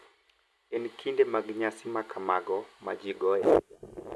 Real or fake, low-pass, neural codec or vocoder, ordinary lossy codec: real; none; none; none